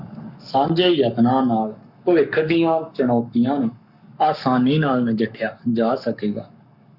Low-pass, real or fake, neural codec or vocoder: 5.4 kHz; fake; codec, 44.1 kHz, 7.8 kbps, Pupu-Codec